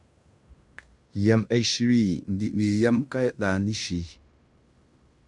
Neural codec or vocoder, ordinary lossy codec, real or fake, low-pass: codec, 16 kHz in and 24 kHz out, 0.9 kbps, LongCat-Audio-Codec, fine tuned four codebook decoder; MP3, 96 kbps; fake; 10.8 kHz